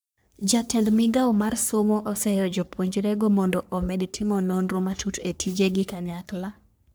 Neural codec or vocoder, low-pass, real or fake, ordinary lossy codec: codec, 44.1 kHz, 3.4 kbps, Pupu-Codec; none; fake; none